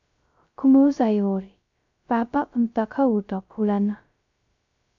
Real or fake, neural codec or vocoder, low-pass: fake; codec, 16 kHz, 0.2 kbps, FocalCodec; 7.2 kHz